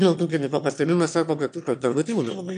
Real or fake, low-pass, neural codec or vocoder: fake; 9.9 kHz; autoencoder, 22.05 kHz, a latent of 192 numbers a frame, VITS, trained on one speaker